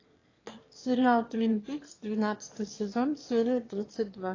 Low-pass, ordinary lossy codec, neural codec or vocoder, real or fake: 7.2 kHz; AAC, 32 kbps; autoencoder, 22.05 kHz, a latent of 192 numbers a frame, VITS, trained on one speaker; fake